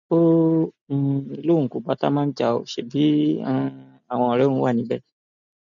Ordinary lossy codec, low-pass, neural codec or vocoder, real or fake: none; 7.2 kHz; none; real